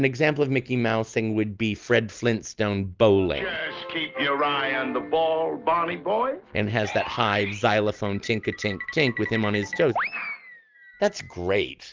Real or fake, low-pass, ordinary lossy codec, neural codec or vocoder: real; 7.2 kHz; Opus, 24 kbps; none